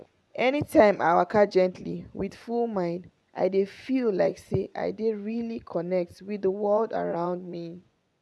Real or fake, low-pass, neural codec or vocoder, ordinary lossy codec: fake; none; vocoder, 24 kHz, 100 mel bands, Vocos; none